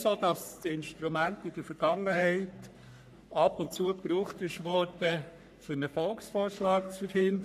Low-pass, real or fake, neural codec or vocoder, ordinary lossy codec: 14.4 kHz; fake; codec, 44.1 kHz, 3.4 kbps, Pupu-Codec; none